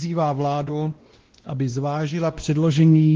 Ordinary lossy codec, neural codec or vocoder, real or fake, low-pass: Opus, 16 kbps; codec, 16 kHz, 1 kbps, X-Codec, WavLM features, trained on Multilingual LibriSpeech; fake; 7.2 kHz